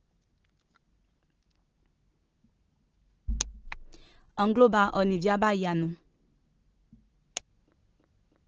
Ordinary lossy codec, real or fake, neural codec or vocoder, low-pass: Opus, 16 kbps; real; none; 7.2 kHz